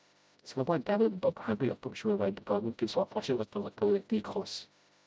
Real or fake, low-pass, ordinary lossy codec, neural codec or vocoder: fake; none; none; codec, 16 kHz, 0.5 kbps, FreqCodec, smaller model